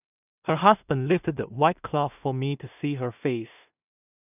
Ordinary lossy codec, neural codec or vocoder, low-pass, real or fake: none; codec, 16 kHz in and 24 kHz out, 0.4 kbps, LongCat-Audio-Codec, two codebook decoder; 3.6 kHz; fake